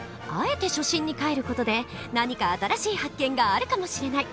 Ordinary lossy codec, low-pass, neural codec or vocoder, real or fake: none; none; none; real